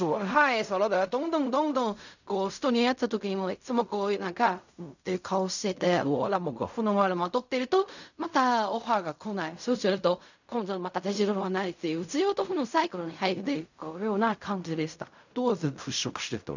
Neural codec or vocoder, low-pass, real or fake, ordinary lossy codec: codec, 16 kHz in and 24 kHz out, 0.4 kbps, LongCat-Audio-Codec, fine tuned four codebook decoder; 7.2 kHz; fake; none